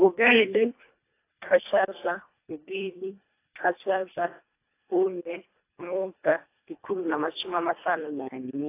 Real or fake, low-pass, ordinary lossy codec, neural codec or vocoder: fake; 3.6 kHz; AAC, 24 kbps; codec, 24 kHz, 1.5 kbps, HILCodec